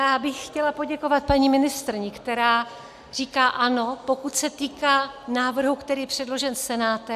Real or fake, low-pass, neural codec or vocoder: real; 14.4 kHz; none